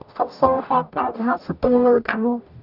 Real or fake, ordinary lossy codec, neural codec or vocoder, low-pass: fake; none; codec, 44.1 kHz, 0.9 kbps, DAC; 5.4 kHz